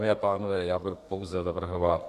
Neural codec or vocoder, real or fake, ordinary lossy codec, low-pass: codec, 32 kHz, 1.9 kbps, SNAC; fake; MP3, 96 kbps; 14.4 kHz